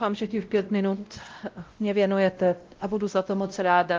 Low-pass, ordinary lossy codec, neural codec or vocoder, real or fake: 7.2 kHz; Opus, 32 kbps; codec, 16 kHz, 0.5 kbps, X-Codec, WavLM features, trained on Multilingual LibriSpeech; fake